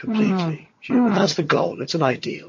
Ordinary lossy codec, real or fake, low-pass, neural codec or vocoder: MP3, 32 kbps; fake; 7.2 kHz; vocoder, 22.05 kHz, 80 mel bands, HiFi-GAN